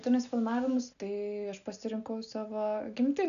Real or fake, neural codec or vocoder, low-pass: real; none; 7.2 kHz